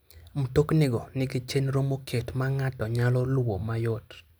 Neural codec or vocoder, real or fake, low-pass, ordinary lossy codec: none; real; none; none